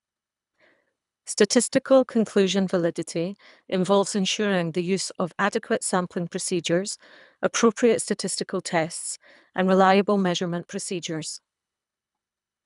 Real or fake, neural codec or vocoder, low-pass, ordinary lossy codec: fake; codec, 24 kHz, 3 kbps, HILCodec; 10.8 kHz; none